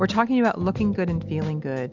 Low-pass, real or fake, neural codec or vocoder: 7.2 kHz; real; none